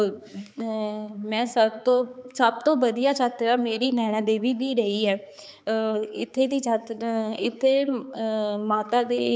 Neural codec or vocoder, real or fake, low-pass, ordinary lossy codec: codec, 16 kHz, 4 kbps, X-Codec, HuBERT features, trained on balanced general audio; fake; none; none